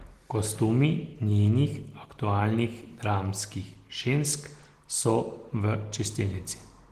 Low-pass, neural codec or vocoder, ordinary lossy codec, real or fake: 14.4 kHz; none; Opus, 16 kbps; real